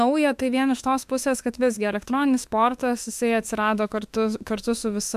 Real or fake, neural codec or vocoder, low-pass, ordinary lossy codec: fake; autoencoder, 48 kHz, 32 numbers a frame, DAC-VAE, trained on Japanese speech; 14.4 kHz; AAC, 96 kbps